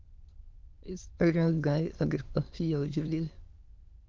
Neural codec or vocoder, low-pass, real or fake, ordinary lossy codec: autoencoder, 22.05 kHz, a latent of 192 numbers a frame, VITS, trained on many speakers; 7.2 kHz; fake; Opus, 32 kbps